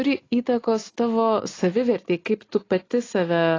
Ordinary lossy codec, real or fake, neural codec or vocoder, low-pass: AAC, 32 kbps; real; none; 7.2 kHz